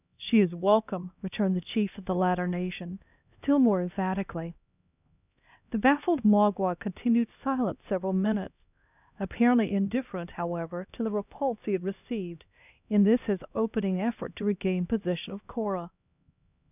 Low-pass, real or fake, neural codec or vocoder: 3.6 kHz; fake; codec, 16 kHz, 1 kbps, X-Codec, HuBERT features, trained on LibriSpeech